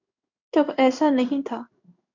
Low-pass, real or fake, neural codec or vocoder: 7.2 kHz; fake; codec, 16 kHz in and 24 kHz out, 1 kbps, XY-Tokenizer